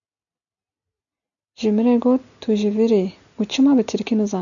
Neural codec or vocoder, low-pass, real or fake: none; 7.2 kHz; real